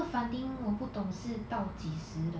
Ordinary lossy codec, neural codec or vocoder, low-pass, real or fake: none; none; none; real